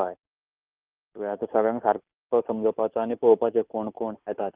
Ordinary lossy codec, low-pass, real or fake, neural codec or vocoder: Opus, 32 kbps; 3.6 kHz; real; none